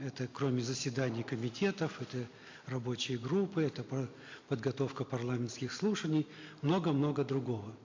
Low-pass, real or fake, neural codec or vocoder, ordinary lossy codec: 7.2 kHz; real; none; MP3, 48 kbps